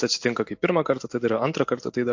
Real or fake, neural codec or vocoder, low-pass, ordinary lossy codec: real; none; 7.2 kHz; MP3, 48 kbps